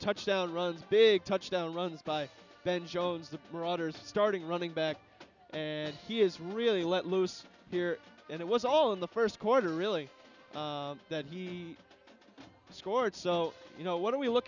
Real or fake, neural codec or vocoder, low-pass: real; none; 7.2 kHz